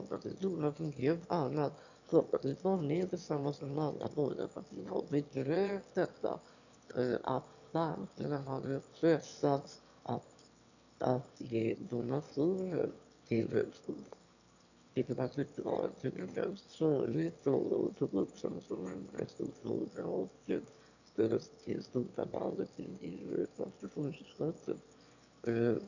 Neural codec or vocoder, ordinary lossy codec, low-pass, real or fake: autoencoder, 22.05 kHz, a latent of 192 numbers a frame, VITS, trained on one speaker; none; 7.2 kHz; fake